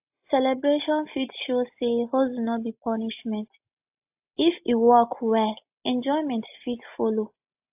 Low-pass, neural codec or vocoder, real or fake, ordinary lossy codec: 3.6 kHz; none; real; AAC, 32 kbps